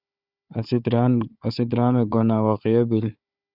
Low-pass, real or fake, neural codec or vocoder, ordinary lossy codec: 5.4 kHz; fake; codec, 16 kHz, 4 kbps, FunCodec, trained on Chinese and English, 50 frames a second; Opus, 64 kbps